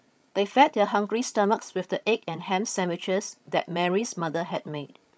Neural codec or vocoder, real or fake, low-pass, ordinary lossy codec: codec, 16 kHz, 16 kbps, FreqCodec, larger model; fake; none; none